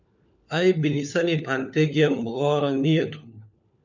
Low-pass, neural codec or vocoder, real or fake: 7.2 kHz; codec, 16 kHz, 4 kbps, FunCodec, trained on LibriTTS, 50 frames a second; fake